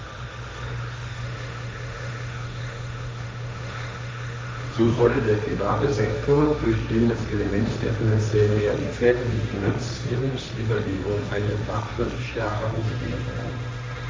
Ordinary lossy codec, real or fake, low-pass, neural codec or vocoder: none; fake; none; codec, 16 kHz, 1.1 kbps, Voila-Tokenizer